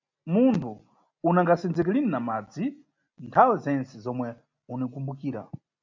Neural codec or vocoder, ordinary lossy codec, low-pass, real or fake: none; MP3, 64 kbps; 7.2 kHz; real